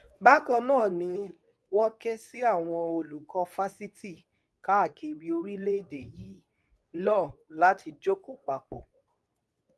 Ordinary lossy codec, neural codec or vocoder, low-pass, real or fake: none; codec, 24 kHz, 0.9 kbps, WavTokenizer, medium speech release version 2; none; fake